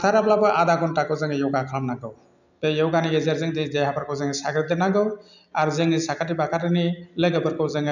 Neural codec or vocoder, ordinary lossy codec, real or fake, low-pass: none; none; real; 7.2 kHz